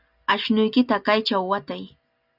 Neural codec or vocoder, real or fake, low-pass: none; real; 5.4 kHz